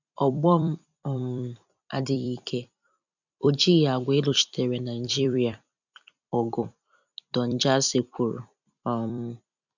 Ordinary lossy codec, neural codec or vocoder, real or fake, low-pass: none; vocoder, 44.1 kHz, 128 mel bands every 256 samples, BigVGAN v2; fake; 7.2 kHz